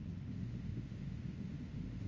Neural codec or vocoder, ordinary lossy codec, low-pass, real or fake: autoencoder, 48 kHz, 32 numbers a frame, DAC-VAE, trained on Japanese speech; Opus, 32 kbps; 7.2 kHz; fake